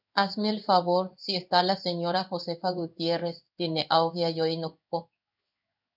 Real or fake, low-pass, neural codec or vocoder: fake; 5.4 kHz; codec, 16 kHz in and 24 kHz out, 1 kbps, XY-Tokenizer